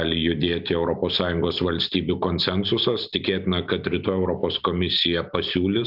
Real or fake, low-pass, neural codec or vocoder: real; 5.4 kHz; none